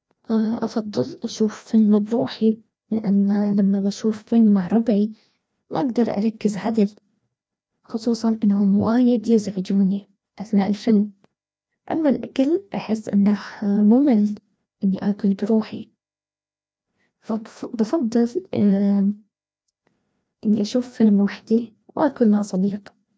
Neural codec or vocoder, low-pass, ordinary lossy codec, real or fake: codec, 16 kHz, 1 kbps, FreqCodec, larger model; none; none; fake